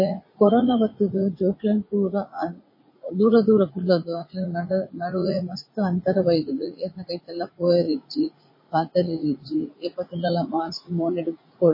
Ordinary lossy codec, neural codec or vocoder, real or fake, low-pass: MP3, 24 kbps; vocoder, 44.1 kHz, 80 mel bands, Vocos; fake; 5.4 kHz